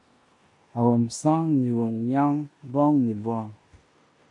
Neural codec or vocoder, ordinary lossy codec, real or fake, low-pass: codec, 16 kHz in and 24 kHz out, 0.9 kbps, LongCat-Audio-Codec, four codebook decoder; MP3, 64 kbps; fake; 10.8 kHz